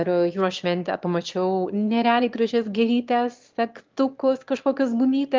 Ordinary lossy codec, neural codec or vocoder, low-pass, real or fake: Opus, 32 kbps; autoencoder, 22.05 kHz, a latent of 192 numbers a frame, VITS, trained on one speaker; 7.2 kHz; fake